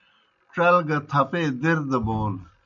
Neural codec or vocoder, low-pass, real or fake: none; 7.2 kHz; real